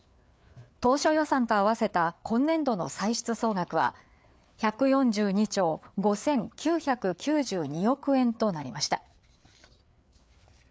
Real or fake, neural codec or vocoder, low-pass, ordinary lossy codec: fake; codec, 16 kHz, 4 kbps, FreqCodec, larger model; none; none